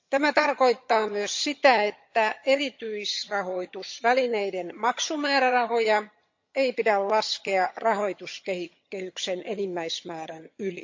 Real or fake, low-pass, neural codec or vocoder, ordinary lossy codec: fake; 7.2 kHz; vocoder, 22.05 kHz, 80 mel bands, HiFi-GAN; MP3, 48 kbps